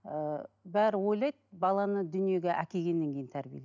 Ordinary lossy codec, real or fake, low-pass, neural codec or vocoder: none; real; 7.2 kHz; none